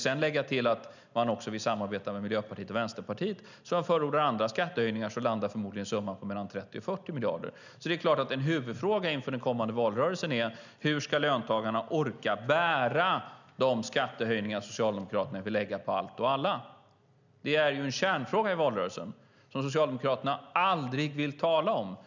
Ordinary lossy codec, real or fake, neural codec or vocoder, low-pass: none; real; none; 7.2 kHz